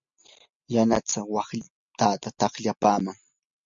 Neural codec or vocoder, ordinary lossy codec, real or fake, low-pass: none; MP3, 48 kbps; real; 7.2 kHz